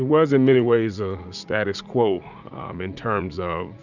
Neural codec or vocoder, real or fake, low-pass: vocoder, 44.1 kHz, 80 mel bands, Vocos; fake; 7.2 kHz